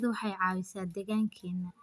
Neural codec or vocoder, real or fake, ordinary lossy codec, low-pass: none; real; none; none